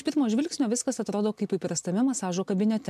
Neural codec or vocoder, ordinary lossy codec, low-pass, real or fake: none; MP3, 96 kbps; 14.4 kHz; real